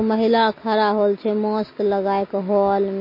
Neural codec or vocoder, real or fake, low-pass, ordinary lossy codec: none; real; 5.4 kHz; MP3, 24 kbps